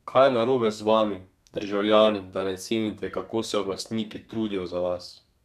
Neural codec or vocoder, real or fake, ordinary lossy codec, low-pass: codec, 32 kHz, 1.9 kbps, SNAC; fake; none; 14.4 kHz